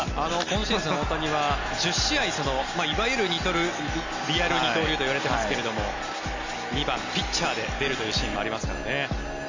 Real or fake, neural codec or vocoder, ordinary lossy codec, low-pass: real; none; AAC, 48 kbps; 7.2 kHz